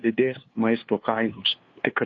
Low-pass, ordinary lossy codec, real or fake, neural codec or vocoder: 7.2 kHz; AAC, 32 kbps; fake; codec, 16 kHz, 2 kbps, FunCodec, trained on LibriTTS, 25 frames a second